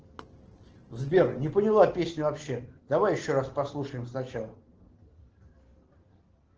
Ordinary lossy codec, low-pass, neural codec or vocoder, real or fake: Opus, 16 kbps; 7.2 kHz; none; real